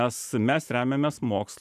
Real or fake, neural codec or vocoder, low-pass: real; none; 14.4 kHz